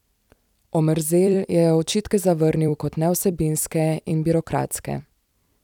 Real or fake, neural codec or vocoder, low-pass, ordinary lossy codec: fake; vocoder, 44.1 kHz, 128 mel bands every 256 samples, BigVGAN v2; 19.8 kHz; none